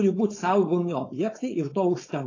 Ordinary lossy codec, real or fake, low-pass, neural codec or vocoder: AAC, 32 kbps; fake; 7.2 kHz; codec, 16 kHz, 4.8 kbps, FACodec